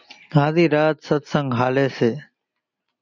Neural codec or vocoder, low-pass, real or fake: none; 7.2 kHz; real